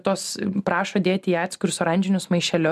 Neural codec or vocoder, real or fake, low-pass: none; real; 14.4 kHz